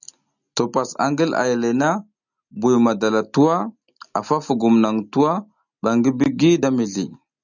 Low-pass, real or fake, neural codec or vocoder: 7.2 kHz; real; none